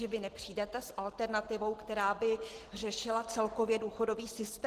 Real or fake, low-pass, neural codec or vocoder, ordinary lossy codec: real; 14.4 kHz; none; Opus, 16 kbps